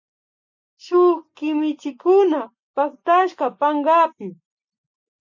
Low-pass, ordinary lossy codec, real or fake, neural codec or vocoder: 7.2 kHz; MP3, 64 kbps; real; none